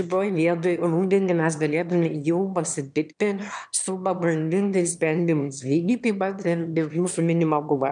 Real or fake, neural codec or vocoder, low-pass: fake; autoencoder, 22.05 kHz, a latent of 192 numbers a frame, VITS, trained on one speaker; 9.9 kHz